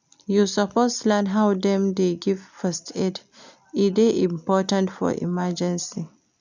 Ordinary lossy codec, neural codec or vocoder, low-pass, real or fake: none; none; 7.2 kHz; real